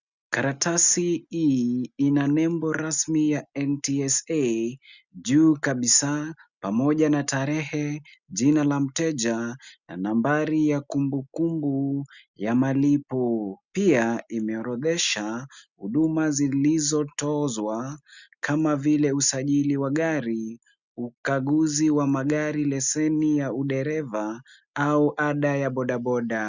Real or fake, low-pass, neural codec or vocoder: real; 7.2 kHz; none